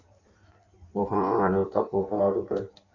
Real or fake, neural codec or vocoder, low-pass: fake; codec, 16 kHz in and 24 kHz out, 1.1 kbps, FireRedTTS-2 codec; 7.2 kHz